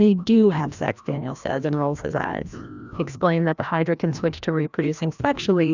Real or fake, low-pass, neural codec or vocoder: fake; 7.2 kHz; codec, 16 kHz, 1 kbps, FreqCodec, larger model